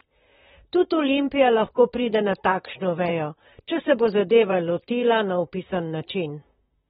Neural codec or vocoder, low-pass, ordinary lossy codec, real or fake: vocoder, 44.1 kHz, 128 mel bands, Pupu-Vocoder; 19.8 kHz; AAC, 16 kbps; fake